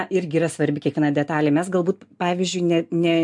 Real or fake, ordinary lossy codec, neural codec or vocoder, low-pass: real; MP3, 64 kbps; none; 10.8 kHz